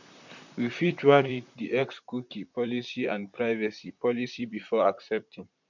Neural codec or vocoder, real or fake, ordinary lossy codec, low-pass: vocoder, 44.1 kHz, 128 mel bands, Pupu-Vocoder; fake; none; 7.2 kHz